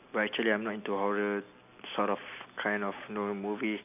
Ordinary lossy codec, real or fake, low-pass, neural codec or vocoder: none; real; 3.6 kHz; none